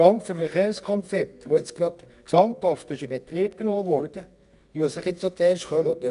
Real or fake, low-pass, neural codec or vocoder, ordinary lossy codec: fake; 10.8 kHz; codec, 24 kHz, 0.9 kbps, WavTokenizer, medium music audio release; none